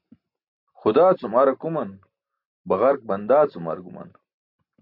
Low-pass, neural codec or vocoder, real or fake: 5.4 kHz; none; real